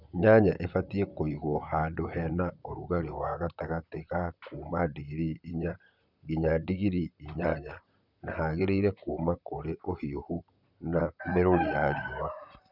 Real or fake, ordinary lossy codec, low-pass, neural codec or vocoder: fake; none; 5.4 kHz; vocoder, 44.1 kHz, 128 mel bands, Pupu-Vocoder